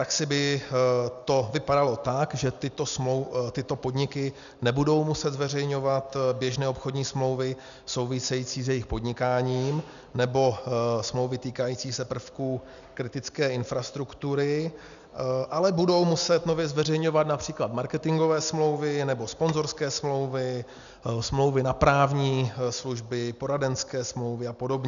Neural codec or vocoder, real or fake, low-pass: none; real; 7.2 kHz